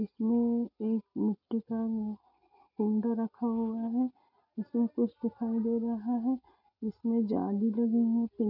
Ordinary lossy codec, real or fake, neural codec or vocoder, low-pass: none; real; none; 5.4 kHz